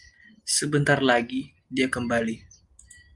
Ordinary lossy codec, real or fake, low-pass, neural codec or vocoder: Opus, 32 kbps; real; 10.8 kHz; none